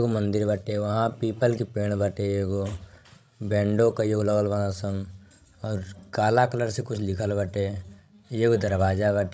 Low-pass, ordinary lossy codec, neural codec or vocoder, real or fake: none; none; codec, 16 kHz, 16 kbps, FreqCodec, larger model; fake